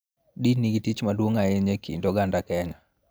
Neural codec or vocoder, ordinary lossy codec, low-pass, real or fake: none; none; none; real